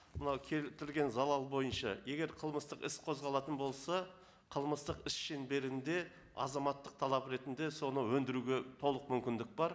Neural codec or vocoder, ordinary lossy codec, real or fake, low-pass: none; none; real; none